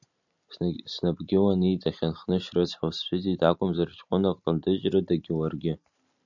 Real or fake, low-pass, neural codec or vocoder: real; 7.2 kHz; none